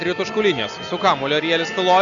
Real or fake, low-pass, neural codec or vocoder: real; 7.2 kHz; none